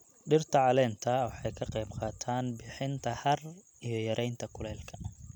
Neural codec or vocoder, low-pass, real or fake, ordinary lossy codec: none; 19.8 kHz; real; none